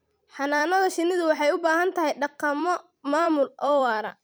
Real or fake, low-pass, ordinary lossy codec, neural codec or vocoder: fake; none; none; vocoder, 44.1 kHz, 128 mel bands every 256 samples, BigVGAN v2